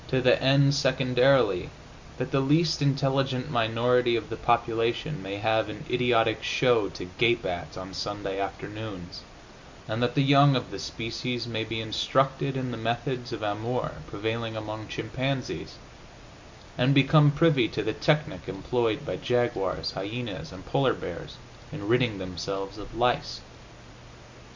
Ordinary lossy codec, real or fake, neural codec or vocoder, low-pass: MP3, 48 kbps; real; none; 7.2 kHz